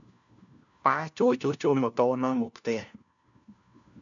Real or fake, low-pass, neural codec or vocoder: fake; 7.2 kHz; codec, 16 kHz, 1 kbps, FunCodec, trained on LibriTTS, 50 frames a second